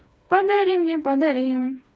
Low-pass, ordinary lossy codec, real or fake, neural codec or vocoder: none; none; fake; codec, 16 kHz, 2 kbps, FreqCodec, smaller model